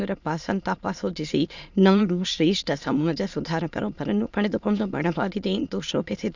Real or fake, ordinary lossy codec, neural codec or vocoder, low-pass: fake; none; autoencoder, 22.05 kHz, a latent of 192 numbers a frame, VITS, trained on many speakers; 7.2 kHz